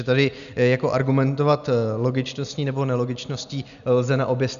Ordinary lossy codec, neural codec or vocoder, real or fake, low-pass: MP3, 96 kbps; none; real; 7.2 kHz